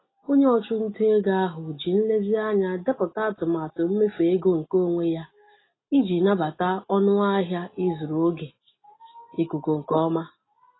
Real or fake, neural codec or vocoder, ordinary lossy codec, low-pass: real; none; AAC, 16 kbps; 7.2 kHz